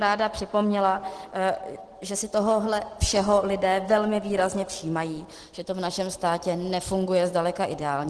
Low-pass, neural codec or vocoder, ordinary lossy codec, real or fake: 10.8 kHz; none; Opus, 16 kbps; real